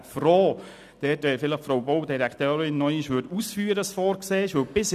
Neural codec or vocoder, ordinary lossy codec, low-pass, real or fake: none; none; 14.4 kHz; real